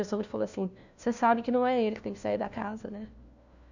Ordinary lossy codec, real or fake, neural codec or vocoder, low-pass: none; fake; codec, 16 kHz, 1 kbps, FunCodec, trained on LibriTTS, 50 frames a second; 7.2 kHz